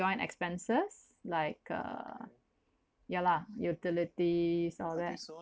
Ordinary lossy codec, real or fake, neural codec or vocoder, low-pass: none; real; none; none